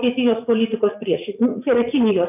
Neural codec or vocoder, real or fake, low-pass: vocoder, 44.1 kHz, 80 mel bands, Vocos; fake; 3.6 kHz